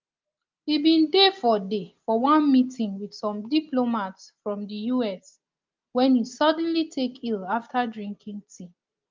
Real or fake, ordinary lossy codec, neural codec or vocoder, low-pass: real; Opus, 24 kbps; none; 7.2 kHz